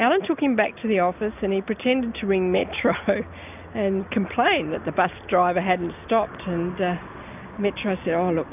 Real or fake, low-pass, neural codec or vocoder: real; 3.6 kHz; none